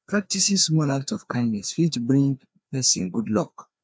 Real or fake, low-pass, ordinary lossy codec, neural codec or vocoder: fake; none; none; codec, 16 kHz, 2 kbps, FreqCodec, larger model